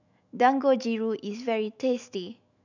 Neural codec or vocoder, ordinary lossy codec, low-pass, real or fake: autoencoder, 48 kHz, 128 numbers a frame, DAC-VAE, trained on Japanese speech; none; 7.2 kHz; fake